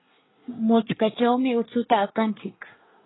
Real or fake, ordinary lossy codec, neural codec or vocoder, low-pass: fake; AAC, 16 kbps; codec, 24 kHz, 1 kbps, SNAC; 7.2 kHz